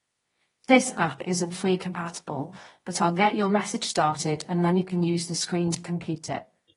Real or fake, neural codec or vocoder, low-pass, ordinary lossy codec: fake; codec, 24 kHz, 0.9 kbps, WavTokenizer, medium music audio release; 10.8 kHz; AAC, 32 kbps